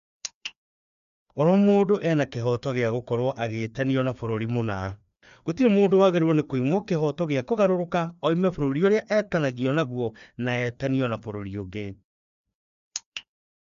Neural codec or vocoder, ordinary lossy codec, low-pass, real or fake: codec, 16 kHz, 2 kbps, FreqCodec, larger model; none; 7.2 kHz; fake